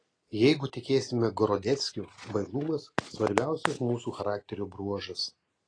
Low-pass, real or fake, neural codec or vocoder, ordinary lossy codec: 9.9 kHz; real; none; AAC, 32 kbps